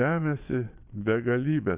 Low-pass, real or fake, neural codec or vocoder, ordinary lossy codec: 3.6 kHz; fake; vocoder, 22.05 kHz, 80 mel bands, Vocos; Opus, 64 kbps